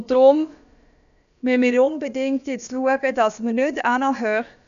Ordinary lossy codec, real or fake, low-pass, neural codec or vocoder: AAC, 96 kbps; fake; 7.2 kHz; codec, 16 kHz, about 1 kbps, DyCAST, with the encoder's durations